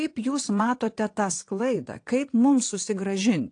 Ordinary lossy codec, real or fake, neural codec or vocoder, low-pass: AAC, 48 kbps; fake; vocoder, 22.05 kHz, 80 mel bands, WaveNeXt; 9.9 kHz